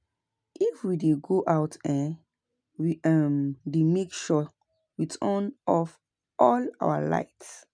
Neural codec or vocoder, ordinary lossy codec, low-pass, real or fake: none; none; 9.9 kHz; real